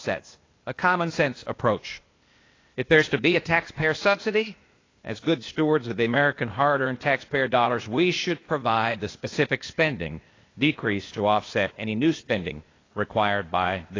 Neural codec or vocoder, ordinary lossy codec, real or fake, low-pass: codec, 16 kHz, 0.8 kbps, ZipCodec; AAC, 32 kbps; fake; 7.2 kHz